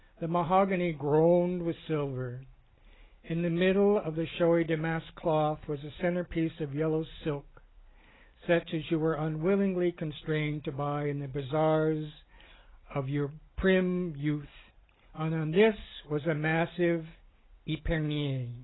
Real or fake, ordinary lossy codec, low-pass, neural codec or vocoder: real; AAC, 16 kbps; 7.2 kHz; none